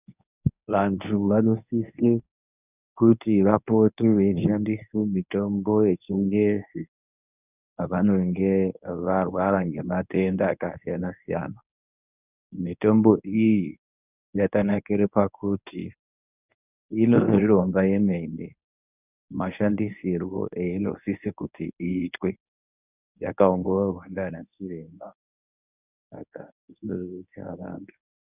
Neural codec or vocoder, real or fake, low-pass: codec, 24 kHz, 0.9 kbps, WavTokenizer, medium speech release version 1; fake; 3.6 kHz